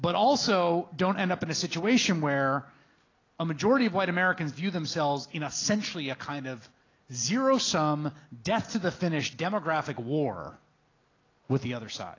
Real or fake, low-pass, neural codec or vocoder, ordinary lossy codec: real; 7.2 kHz; none; AAC, 32 kbps